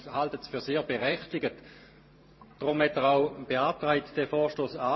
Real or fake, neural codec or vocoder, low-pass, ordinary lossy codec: fake; vocoder, 44.1 kHz, 128 mel bands every 512 samples, BigVGAN v2; 7.2 kHz; MP3, 24 kbps